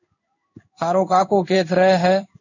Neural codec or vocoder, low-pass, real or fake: codec, 16 kHz in and 24 kHz out, 1 kbps, XY-Tokenizer; 7.2 kHz; fake